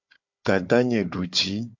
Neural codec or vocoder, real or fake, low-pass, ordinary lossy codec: codec, 16 kHz, 4 kbps, FunCodec, trained on Chinese and English, 50 frames a second; fake; 7.2 kHz; MP3, 64 kbps